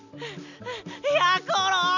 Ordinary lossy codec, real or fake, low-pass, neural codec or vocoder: none; real; 7.2 kHz; none